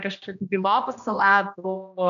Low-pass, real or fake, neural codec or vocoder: 7.2 kHz; fake; codec, 16 kHz, 1 kbps, X-Codec, HuBERT features, trained on general audio